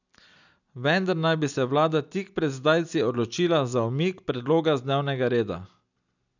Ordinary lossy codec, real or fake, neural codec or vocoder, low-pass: none; real; none; 7.2 kHz